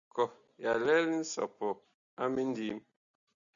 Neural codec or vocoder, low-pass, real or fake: none; 7.2 kHz; real